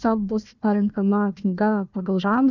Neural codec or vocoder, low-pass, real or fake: codec, 16 kHz, 1 kbps, FunCodec, trained on Chinese and English, 50 frames a second; 7.2 kHz; fake